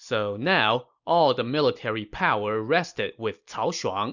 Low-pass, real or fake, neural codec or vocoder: 7.2 kHz; real; none